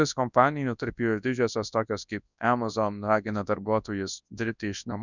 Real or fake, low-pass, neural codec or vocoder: fake; 7.2 kHz; codec, 24 kHz, 0.9 kbps, WavTokenizer, large speech release